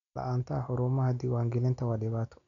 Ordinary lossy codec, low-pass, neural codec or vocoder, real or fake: MP3, 96 kbps; 7.2 kHz; none; real